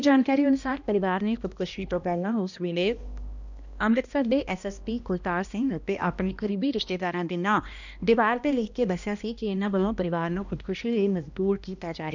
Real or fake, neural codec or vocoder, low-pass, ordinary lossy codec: fake; codec, 16 kHz, 1 kbps, X-Codec, HuBERT features, trained on balanced general audio; 7.2 kHz; none